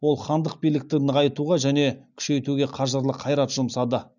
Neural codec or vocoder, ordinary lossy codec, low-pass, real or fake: none; none; 7.2 kHz; real